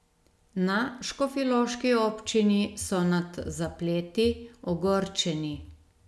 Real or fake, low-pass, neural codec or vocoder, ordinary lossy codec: real; none; none; none